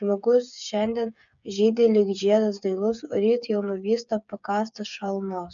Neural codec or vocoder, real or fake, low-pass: codec, 16 kHz, 8 kbps, FreqCodec, smaller model; fake; 7.2 kHz